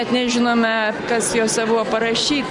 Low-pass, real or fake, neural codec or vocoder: 10.8 kHz; real; none